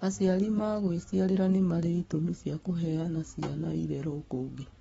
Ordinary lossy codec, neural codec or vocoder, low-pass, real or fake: AAC, 24 kbps; codec, 44.1 kHz, 7.8 kbps, Pupu-Codec; 19.8 kHz; fake